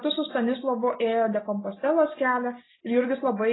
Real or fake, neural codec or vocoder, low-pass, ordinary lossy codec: real; none; 7.2 kHz; AAC, 16 kbps